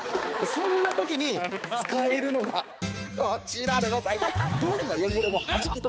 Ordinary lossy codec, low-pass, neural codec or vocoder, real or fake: none; none; codec, 16 kHz, 4 kbps, X-Codec, HuBERT features, trained on balanced general audio; fake